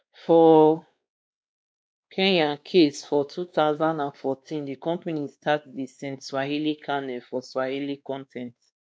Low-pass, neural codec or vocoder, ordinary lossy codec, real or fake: none; codec, 16 kHz, 2 kbps, X-Codec, WavLM features, trained on Multilingual LibriSpeech; none; fake